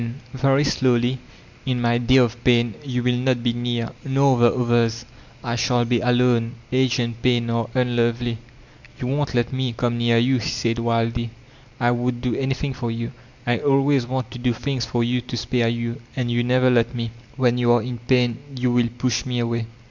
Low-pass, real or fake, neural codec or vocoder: 7.2 kHz; real; none